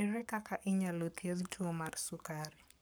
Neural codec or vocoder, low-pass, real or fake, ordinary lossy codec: codec, 44.1 kHz, 7.8 kbps, Pupu-Codec; none; fake; none